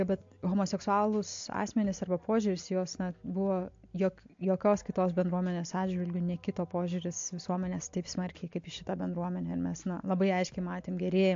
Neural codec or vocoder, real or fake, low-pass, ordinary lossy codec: none; real; 7.2 kHz; MP3, 48 kbps